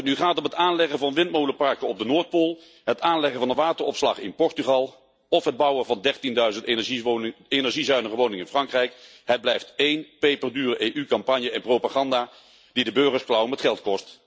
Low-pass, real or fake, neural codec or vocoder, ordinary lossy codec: none; real; none; none